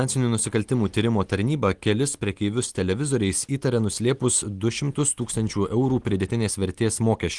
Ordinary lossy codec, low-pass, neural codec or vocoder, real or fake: Opus, 24 kbps; 10.8 kHz; none; real